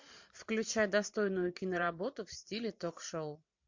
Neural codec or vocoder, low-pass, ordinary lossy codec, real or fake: vocoder, 44.1 kHz, 128 mel bands every 512 samples, BigVGAN v2; 7.2 kHz; MP3, 48 kbps; fake